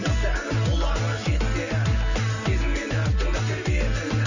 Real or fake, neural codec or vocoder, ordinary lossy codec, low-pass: real; none; none; 7.2 kHz